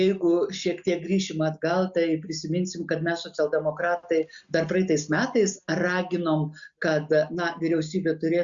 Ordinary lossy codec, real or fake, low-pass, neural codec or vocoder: Opus, 64 kbps; real; 7.2 kHz; none